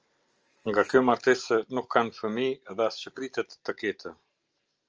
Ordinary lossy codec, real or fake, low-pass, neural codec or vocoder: Opus, 24 kbps; real; 7.2 kHz; none